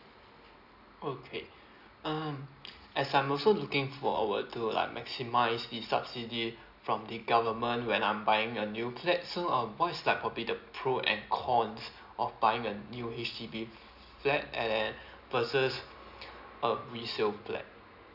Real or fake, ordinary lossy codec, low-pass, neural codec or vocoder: real; none; 5.4 kHz; none